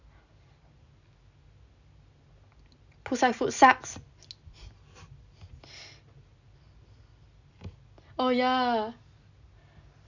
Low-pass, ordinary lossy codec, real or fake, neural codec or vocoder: 7.2 kHz; none; real; none